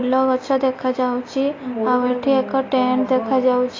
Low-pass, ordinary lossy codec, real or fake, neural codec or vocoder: 7.2 kHz; AAC, 32 kbps; real; none